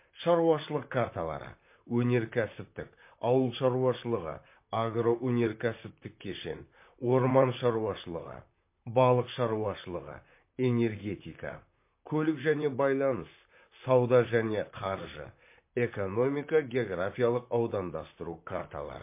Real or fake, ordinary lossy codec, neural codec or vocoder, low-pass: fake; MP3, 24 kbps; vocoder, 44.1 kHz, 128 mel bands, Pupu-Vocoder; 3.6 kHz